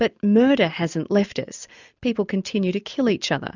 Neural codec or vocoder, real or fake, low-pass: none; real; 7.2 kHz